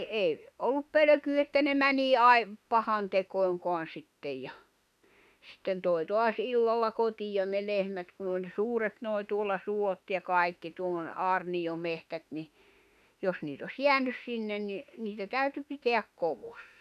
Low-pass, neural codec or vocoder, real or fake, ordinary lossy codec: 14.4 kHz; autoencoder, 48 kHz, 32 numbers a frame, DAC-VAE, trained on Japanese speech; fake; none